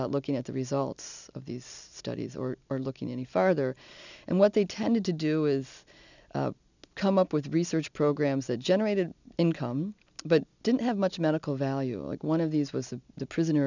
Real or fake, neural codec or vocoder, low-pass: real; none; 7.2 kHz